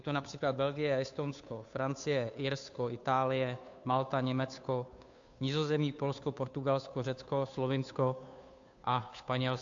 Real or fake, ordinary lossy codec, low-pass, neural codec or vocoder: fake; AAC, 64 kbps; 7.2 kHz; codec, 16 kHz, 2 kbps, FunCodec, trained on Chinese and English, 25 frames a second